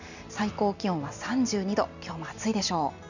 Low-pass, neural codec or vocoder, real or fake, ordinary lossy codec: 7.2 kHz; none; real; none